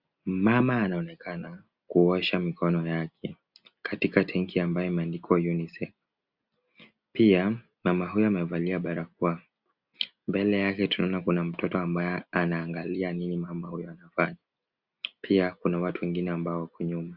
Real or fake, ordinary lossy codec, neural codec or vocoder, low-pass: real; Opus, 64 kbps; none; 5.4 kHz